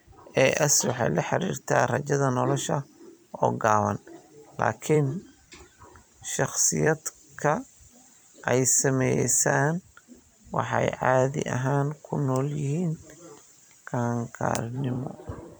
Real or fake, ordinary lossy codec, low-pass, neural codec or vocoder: fake; none; none; vocoder, 44.1 kHz, 128 mel bands every 256 samples, BigVGAN v2